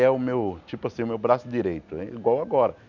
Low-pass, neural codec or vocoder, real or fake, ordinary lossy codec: 7.2 kHz; none; real; none